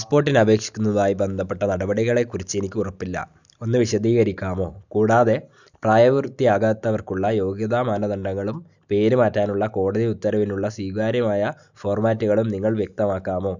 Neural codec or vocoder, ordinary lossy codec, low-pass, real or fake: none; none; 7.2 kHz; real